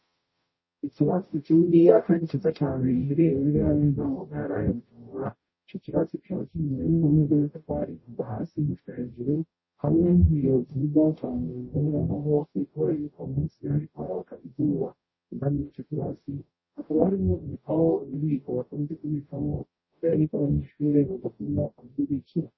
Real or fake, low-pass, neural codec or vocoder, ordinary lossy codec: fake; 7.2 kHz; codec, 44.1 kHz, 0.9 kbps, DAC; MP3, 24 kbps